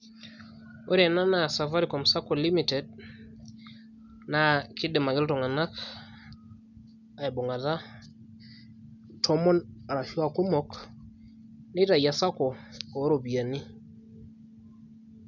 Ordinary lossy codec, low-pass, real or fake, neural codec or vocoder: none; 7.2 kHz; real; none